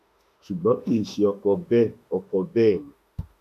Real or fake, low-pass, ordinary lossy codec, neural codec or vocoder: fake; 14.4 kHz; none; autoencoder, 48 kHz, 32 numbers a frame, DAC-VAE, trained on Japanese speech